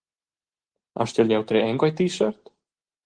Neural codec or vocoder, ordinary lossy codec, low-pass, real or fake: none; Opus, 16 kbps; 9.9 kHz; real